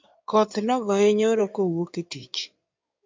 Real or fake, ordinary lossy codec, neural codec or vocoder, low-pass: fake; MP3, 64 kbps; codec, 16 kHz in and 24 kHz out, 2.2 kbps, FireRedTTS-2 codec; 7.2 kHz